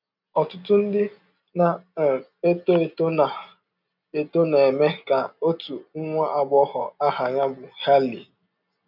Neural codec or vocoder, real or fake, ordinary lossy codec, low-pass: none; real; none; 5.4 kHz